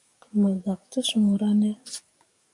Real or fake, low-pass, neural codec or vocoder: fake; 10.8 kHz; codec, 44.1 kHz, 7.8 kbps, DAC